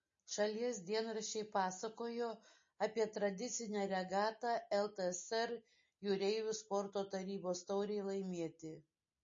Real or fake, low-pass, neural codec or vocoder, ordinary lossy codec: real; 7.2 kHz; none; MP3, 32 kbps